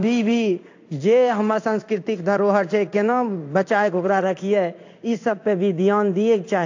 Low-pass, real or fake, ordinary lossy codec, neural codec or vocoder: 7.2 kHz; fake; AAC, 48 kbps; codec, 16 kHz in and 24 kHz out, 1 kbps, XY-Tokenizer